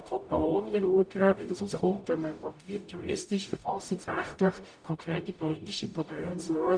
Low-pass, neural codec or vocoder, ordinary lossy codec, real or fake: 9.9 kHz; codec, 44.1 kHz, 0.9 kbps, DAC; none; fake